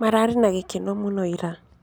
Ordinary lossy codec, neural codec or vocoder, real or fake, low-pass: none; none; real; none